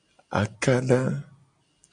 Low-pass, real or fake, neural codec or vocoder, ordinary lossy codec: 9.9 kHz; real; none; MP3, 96 kbps